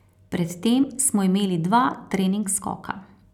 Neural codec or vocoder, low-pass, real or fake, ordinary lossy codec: none; 19.8 kHz; real; none